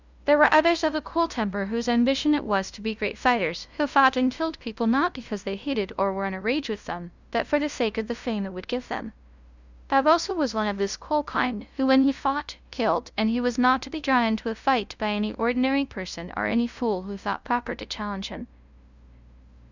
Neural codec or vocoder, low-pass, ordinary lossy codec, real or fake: codec, 16 kHz, 0.5 kbps, FunCodec, trained on LibriTTS, 25 frames a second; 7.2 kHz; Opus, 64 kbps; fake